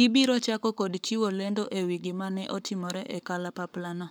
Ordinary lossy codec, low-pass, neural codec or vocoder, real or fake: none; none; codec, 44.1 kHz, 7.8 kbps, Pupu-Codec; fake